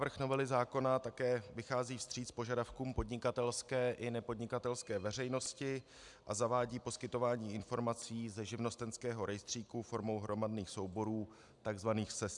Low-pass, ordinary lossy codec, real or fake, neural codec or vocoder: 10.8 kHz; MP3, 96 kbps; real; none